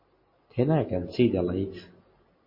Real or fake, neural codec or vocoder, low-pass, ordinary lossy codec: real; none; 5.4 kHz; MP3, 24 kbps